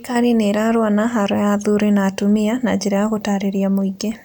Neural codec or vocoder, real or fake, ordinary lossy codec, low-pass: none; real; none; none